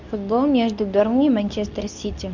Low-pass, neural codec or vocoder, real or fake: 7.2 kHz; codec, 24 kHz, 0.9 kbps, WavTokenizer, medium speech release version 2; fake